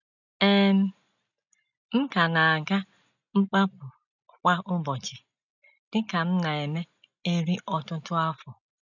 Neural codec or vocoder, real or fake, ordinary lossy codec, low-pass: none; real; none; 7.2 kHz